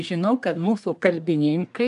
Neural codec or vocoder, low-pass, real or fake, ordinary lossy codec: codec, 24 kHz, 1 kbps, SNAC; 10.8 kHz; fake; AAC, 96 kbps